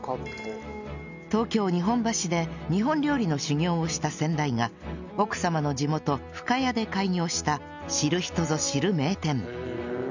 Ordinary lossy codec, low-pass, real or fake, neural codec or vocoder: none; 7.2 kHz; real; none